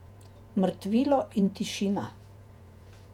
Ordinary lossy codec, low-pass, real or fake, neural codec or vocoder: none; 19.8 kHz; fake; vocoder, 48 kHz, 128 mel bands, Vocos